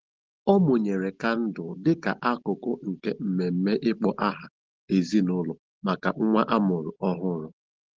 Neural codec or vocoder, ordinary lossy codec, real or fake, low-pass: none; Opus, 16 kbps; real; 7.2 kHz